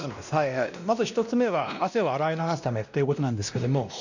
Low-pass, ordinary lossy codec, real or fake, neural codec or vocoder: 7.2 kHz; none; fake; codec, 16 kHz, 1 kbps, X-Codec, WavLM features, trained on Multilingual LibriSpeech